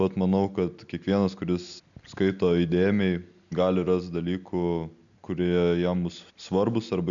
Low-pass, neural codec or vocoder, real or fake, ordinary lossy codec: 7.2 kHz; none; real; MP3, 96 kbps